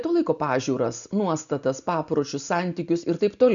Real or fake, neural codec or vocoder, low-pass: real; none; 7.2 kHz